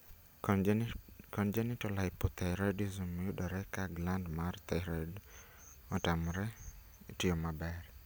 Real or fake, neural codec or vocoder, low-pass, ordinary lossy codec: real; none; none; none